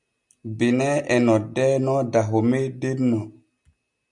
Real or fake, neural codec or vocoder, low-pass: real; none; 10.8 kHz